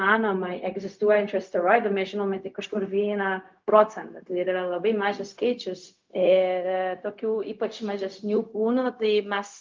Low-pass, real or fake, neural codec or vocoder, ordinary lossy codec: 7.2 kHz; fake; codec, 16 kHz, 0.4 kbps, LongCat-Audio-Codec; Opus, 24 kbps